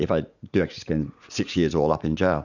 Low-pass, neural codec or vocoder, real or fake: 7.2 kHz; vocoder, 44.1 kHz, 80 mel bands, Vocos; fake